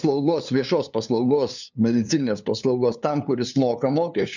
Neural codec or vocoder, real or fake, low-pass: codec, 16 kHz, 2 kbps, FunCodec, trained on LibriTTS, 25 frames a second; fake; 7.2 kHz